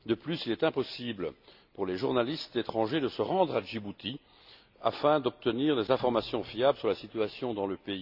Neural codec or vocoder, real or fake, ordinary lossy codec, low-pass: none; real; AAC, 48 kbps; 5.4 kHz